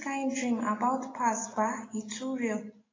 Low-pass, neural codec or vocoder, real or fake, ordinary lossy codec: 7.2 kHz; none; real; AAC, 32 kbps